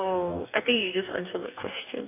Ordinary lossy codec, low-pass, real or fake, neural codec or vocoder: none; 3.6 kHz; fake; codec, 44.1 kHz, 2.6 kbps, DAC